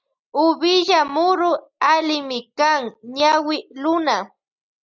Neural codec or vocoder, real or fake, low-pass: none; real; 7.2 kHz